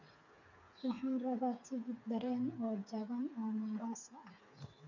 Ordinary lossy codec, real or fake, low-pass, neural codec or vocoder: none; fake; 7.2 kHz; codec, 16 kHz, 8 kbps, FreqCodec, smaller model